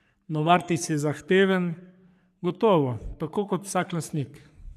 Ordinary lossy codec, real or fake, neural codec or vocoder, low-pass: none; fake; codec, 44.1 kHz, 3.4 kbps, Pupu-Codec; 14.4 kHz